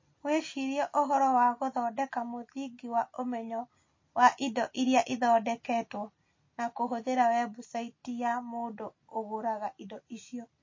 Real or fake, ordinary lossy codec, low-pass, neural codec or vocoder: real; MP3, 32 kbps; 7.2 kHz; none